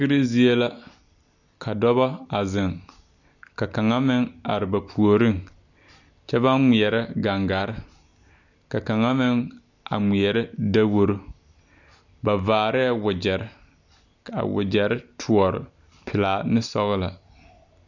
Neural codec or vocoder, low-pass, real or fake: none; 7.2 kHz; real